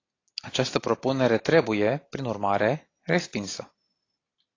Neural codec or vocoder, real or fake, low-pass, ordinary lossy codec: none; real; 7.2 kHz; AAC, 32 kbps